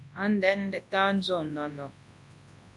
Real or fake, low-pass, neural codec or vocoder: fake; 10.8 kHz; codec, 24 kHz, 0.9 kbps, WavTokenizer, large speech release